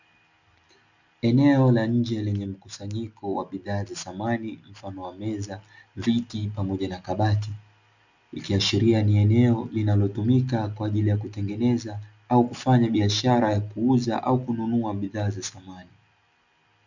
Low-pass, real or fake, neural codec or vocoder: 7.2 kHz; real; none